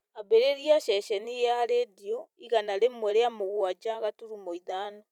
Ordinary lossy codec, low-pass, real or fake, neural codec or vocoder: none; 19.8 kHz; fake; vocoder, 44.1 kHz, 128 mel bands every 512 samples, BigVGAN v2